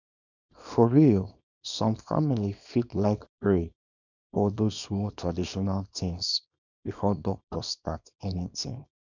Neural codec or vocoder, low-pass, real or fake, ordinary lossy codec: codec, 24 kHz, 0.9 kbps, WavTokenizer, small release; 7.2 kHz; fake; none